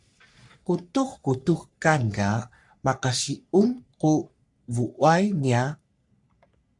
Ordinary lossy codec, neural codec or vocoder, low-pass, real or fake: AAC, 64 kbps; codec, 44.1 kHz, 3.4 kbps, Pupu-Codec; 10.8 kHz; fake